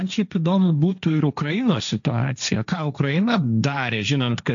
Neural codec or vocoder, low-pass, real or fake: codec, 16 kHz, 1.1 kbps, Voila-Tokenizer; 7.2 kHz; fake